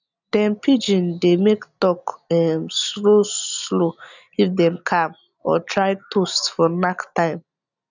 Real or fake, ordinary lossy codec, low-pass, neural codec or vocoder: real; none; 7.2 kHz; none